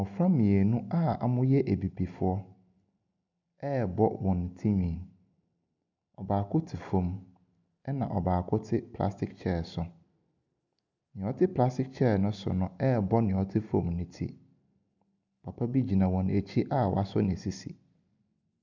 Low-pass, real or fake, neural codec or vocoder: 7.2 kHz; real; none